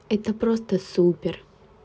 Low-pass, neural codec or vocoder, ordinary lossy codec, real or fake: none; none; none; real